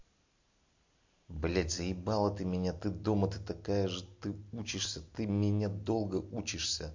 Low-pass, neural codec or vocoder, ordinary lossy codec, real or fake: 7.2 kHz; none; MP3, 48 kbps; real